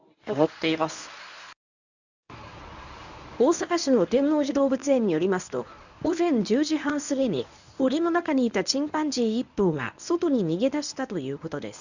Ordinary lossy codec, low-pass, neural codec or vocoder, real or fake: none; 7.2 kHz; codec, 24 kHz, 0.9 kbps, WavTokenizer, medium speech release version 1; fake